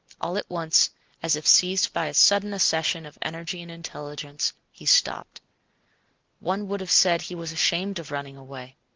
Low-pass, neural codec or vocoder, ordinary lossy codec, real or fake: 7.2 kHz; none; Opus, 24 kbps; real